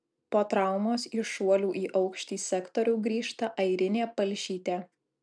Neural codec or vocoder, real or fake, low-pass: none; real; 9.9 kHz